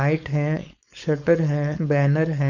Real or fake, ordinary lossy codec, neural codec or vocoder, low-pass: fake; none; codec, 16 kHz, 4.8 kbps, FACodec; 7.2 kHz